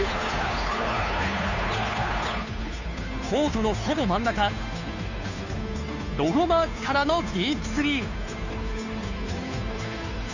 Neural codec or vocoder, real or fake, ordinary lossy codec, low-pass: codec, 16 kHz, 2 kbps, FunCodec, trained on Chinese and English, 25 frames a second; fake; none; 7.2 kHz